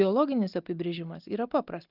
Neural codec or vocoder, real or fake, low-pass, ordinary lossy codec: none; real; 5.4 kHz; Opus, 24 kbps